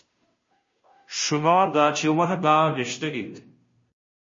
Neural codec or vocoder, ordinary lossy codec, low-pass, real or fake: codec, 16 kHz, 0.5 kbps, FunCodec, trained on Chinese and English, 25 frames a second; MP3, 32 kbps; 7.2 kHz; fake